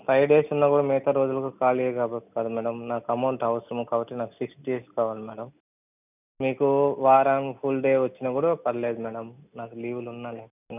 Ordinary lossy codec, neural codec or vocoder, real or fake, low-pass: none; none; real; 3.6 kHz